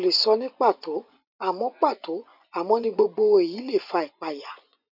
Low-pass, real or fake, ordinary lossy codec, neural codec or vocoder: 5.4 kHz; fake; none; vocoder, 24 kHz, 100 mel bands, Vocos